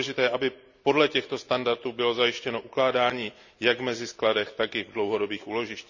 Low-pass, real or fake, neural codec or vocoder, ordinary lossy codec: 7.2 kHz; real; none; none